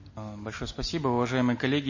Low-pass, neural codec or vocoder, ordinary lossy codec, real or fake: 7.2 kHz; none; MP3, 32 kbps; real